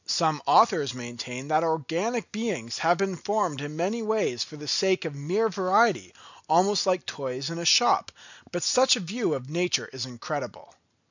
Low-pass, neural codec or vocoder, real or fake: 7.2 kHz; none; real